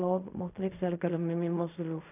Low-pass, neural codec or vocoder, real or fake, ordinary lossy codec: 3.6 kHz; codec, 16 kHz in and 24 kHz out, 0.4 kbps, LongCat-Audio-Codec, fine tuned four codebook decoder; fake; none